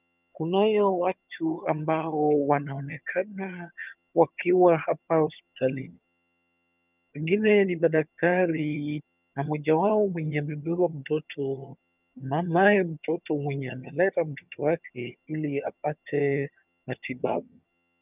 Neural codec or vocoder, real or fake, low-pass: vocoder, 22.05 kHz, 80 mel bands, HiFi-GAN; fake; 3.6 kHz